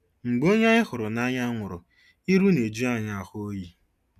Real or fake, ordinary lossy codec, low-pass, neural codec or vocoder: real; none; 14.4 kHz; none